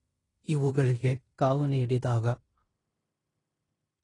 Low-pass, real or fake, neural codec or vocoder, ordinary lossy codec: 10.8 kHz; fake; codec, 16 kHz in and 24 kHz out, 0.9 kbps, LongCat-Audio-Codec, fine tuned four codebook decoder; AAC, 32 kbps